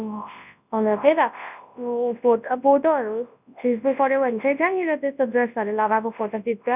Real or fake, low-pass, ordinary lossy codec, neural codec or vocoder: fake; 3.6 kHz; none; codec, 24 kHz, 0.9 kbps, WavTokenizer, large speech release